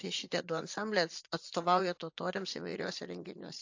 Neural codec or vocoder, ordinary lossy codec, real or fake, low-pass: vocoder, 44.1 kHz, 80 mel bands, Vocos; AAC, 48 kbps; fake; 7.2 kHz